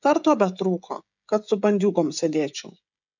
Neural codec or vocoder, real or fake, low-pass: codec, 16 kHz, 16 kbps, FreqCodec, smaller model; fake; 7.2 kHz